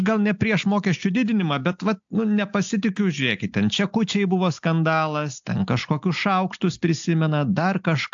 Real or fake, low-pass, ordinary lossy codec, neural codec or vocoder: real; 7.2 kHz; AAC, 64 kbps; none